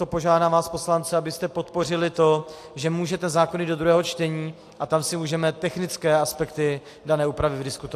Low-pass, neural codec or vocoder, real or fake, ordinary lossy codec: 14.4 kHz; none; real; AAC, 64 kbps